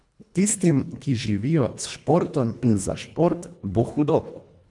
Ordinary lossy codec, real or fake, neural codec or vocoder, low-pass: none; fake; codec, 24 kHz, 1.5 kbps, HILCodec; 10.8 kHz